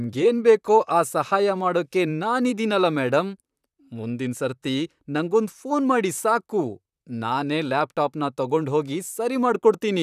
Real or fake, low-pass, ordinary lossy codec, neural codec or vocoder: fake; 14.4 kHz; none; vocoder, 44.1 kHz, 128 mel bands, Pupu-Vocoder